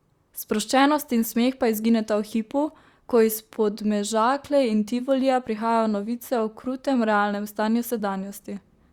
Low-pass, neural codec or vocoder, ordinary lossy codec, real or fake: 19.8 kHz; vocoder, 44.1 kHz, 128 mel bands, Pupu-Vocoder; Opus, 64 kbps; fake